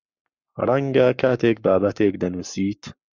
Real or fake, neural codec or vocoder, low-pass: fake; codec, 44.1 kHz, 7.8 kbps, Pupu-Codec; 7.2 kHz